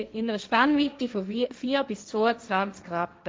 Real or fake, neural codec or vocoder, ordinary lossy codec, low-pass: fake; codec, 16 kHz, 1.1 kbps, Voila-Tokenizer; none; none